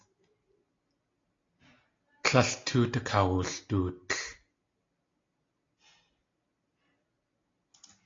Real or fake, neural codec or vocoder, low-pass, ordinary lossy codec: real; none; 7.2 kHz; AAC, 32 kbps